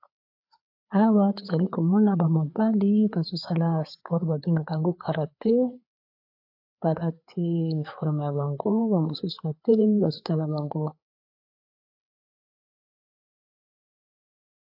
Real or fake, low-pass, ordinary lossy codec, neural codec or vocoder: fake; 5.4 kHz; AAC, 48 kbps; codec, 16 kHz, 4 kbps, FreqCodec, larger model